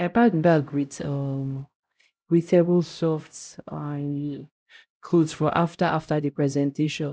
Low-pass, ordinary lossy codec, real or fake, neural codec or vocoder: none; none; fake; codec, 16 kHz, 0.5 kbps, X-Codec, HuBERT features, trained on LibriSpeech